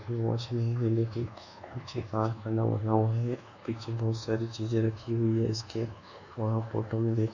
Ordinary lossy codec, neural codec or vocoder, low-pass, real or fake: none; codec, 24 kHz, 1.2 kbps, DualCodec; 7.2 kHz; fake